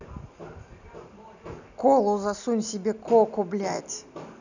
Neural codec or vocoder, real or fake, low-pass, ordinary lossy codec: none; real; 7.2 kHz; none